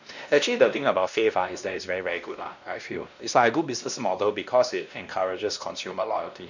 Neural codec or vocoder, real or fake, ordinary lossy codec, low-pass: codec, 16 kHz, 1 kbps, X-Codec, WavLM features, trained on Multilingual LibriSpeech; fake; none; 7.2 kHz